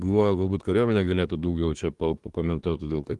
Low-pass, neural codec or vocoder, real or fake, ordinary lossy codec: 10.8 kHz; codec, 24 kHz, 1 kbps, SNAC; fake; Opus, 24 kbps